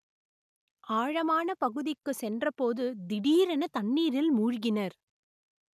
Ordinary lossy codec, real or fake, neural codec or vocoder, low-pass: none; real; none; 14.4 kHz